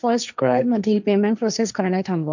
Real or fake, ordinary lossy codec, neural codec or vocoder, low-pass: fake; none; codec, 16 kHz, 1.1 kbps, Voila-Tokenizer; 7.2 kHz